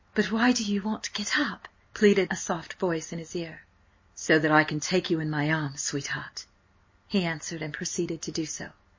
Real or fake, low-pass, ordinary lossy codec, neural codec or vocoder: real; 7.2 kHz; MP3, 32 kbps; none